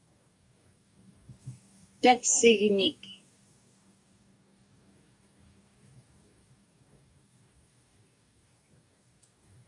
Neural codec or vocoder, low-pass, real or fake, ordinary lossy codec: codec, 44.1 kHz, 2.6 kbps, DAC; 10.8 kHz; fake; AAC, 64 kbps